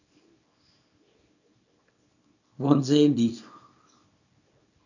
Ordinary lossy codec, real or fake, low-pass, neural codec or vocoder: none; fake; 7.2 kHz; codec, 24 kHz, 0.9 kbps, WavTokenizer, small release